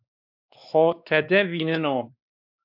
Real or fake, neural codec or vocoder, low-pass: fake; codec, 16 kHz, 2 kbps, X-Codec, WavLM features, trained on Multilingual LibriSpeech; 5.4 kHz